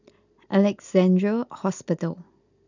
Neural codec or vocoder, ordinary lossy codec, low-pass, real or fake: none; none; 7.2 kHz; real